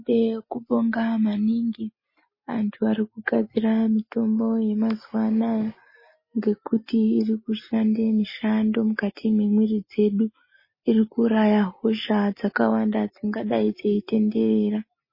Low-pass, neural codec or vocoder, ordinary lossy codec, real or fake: 5.4 kHz; none; MP3, 24 kbps; real